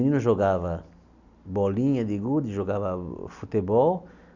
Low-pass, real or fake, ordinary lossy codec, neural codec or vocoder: 7.2 kHz; real; none; none